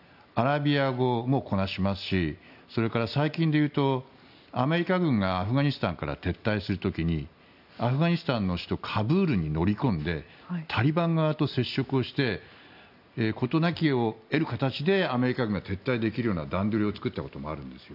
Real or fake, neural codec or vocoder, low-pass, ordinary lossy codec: real; none; 5.4 kHz; none